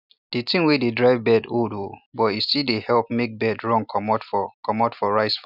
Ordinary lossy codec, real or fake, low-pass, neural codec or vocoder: none; real; 5.4 kHz; none